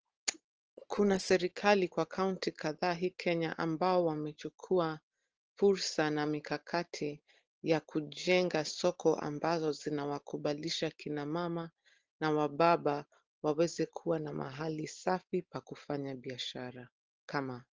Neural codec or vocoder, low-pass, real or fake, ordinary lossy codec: none; 7.2 kHz; real; Opus, 16 kbps